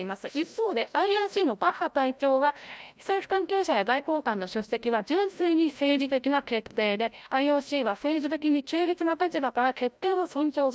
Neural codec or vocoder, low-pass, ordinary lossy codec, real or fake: codec, 16 kHz, 0.5 kbps, FreqCodec, larger model; none; none; fake